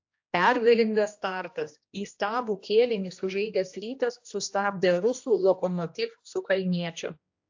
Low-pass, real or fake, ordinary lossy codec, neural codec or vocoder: 7.2 kHz; fake; AAC, 48 kbps; codec, 16 kHz, 1 kbps, X-Codec, HuBERT features, trained on general audio